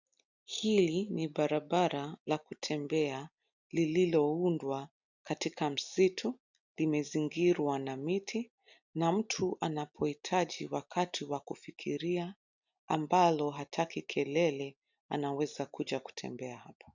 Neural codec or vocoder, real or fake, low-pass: none; real; 7.2 kHz